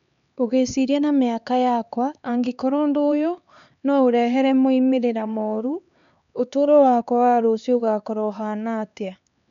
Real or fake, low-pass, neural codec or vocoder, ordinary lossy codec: fake; 7.2 kHz; codec, 16 kHz, 4 kbps, X-Codec, HuBERT features, trained on LibriSpeech; none